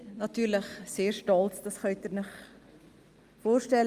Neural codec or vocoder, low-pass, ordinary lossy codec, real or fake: none; 14.4 kHz; Opus, 32 kbps; real